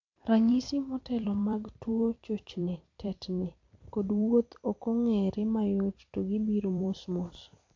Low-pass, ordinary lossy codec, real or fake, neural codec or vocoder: 7.2 kHz; MP3, 48 kbps; fake; vocoder, 44.1 kHz, 128 mel bands every 256 samples, BigVGAN v2